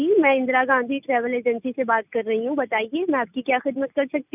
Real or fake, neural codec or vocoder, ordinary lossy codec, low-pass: real; none; none; 3.6 kHz